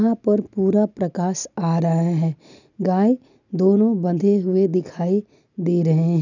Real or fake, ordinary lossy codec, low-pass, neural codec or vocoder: real; none; 7.2 kHz; none